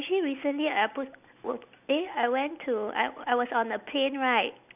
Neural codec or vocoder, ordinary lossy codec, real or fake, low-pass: none; none; real; 3.6 kHz